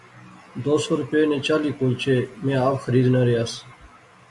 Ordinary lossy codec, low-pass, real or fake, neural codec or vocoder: MP3, 64 kbps; 10.8 kHz; real; none